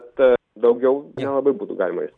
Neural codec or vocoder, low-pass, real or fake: none; 9.9 kHz; real